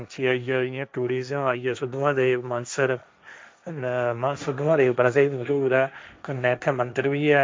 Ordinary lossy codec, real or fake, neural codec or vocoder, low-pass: none; fake; codec, 16 kHz, 1.1 kbps, Voila-Tokenizer; none